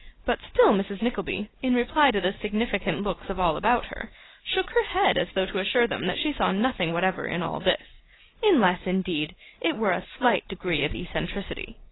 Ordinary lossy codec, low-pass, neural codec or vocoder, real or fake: AAC, 16 kbps; 7.2 kHz; none; real